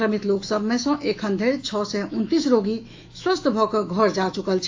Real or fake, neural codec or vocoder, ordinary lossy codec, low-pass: fake; autoencoder, 48 kHz, 128 numbers a frame, DAC-VAE, trained on Japanese speech; AAC, 48 kbps; 7.2 kHz